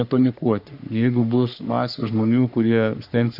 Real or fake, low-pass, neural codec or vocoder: fake; 5.4 kHz; codec, 44.1 kHz, 3.4 kbps, Pupu-Codec